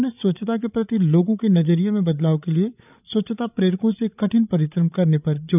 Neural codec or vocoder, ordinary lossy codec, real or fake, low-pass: codec, 16 kHz, 8 kbps, FreqCodec, larger model; none; fake; 3.6 kHz